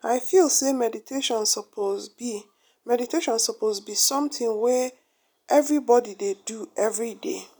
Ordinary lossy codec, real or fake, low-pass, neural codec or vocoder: none; real; none; none